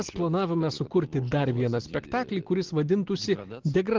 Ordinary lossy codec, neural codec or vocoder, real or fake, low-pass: Opus, 16 kbps; none; real; 7.2 kHz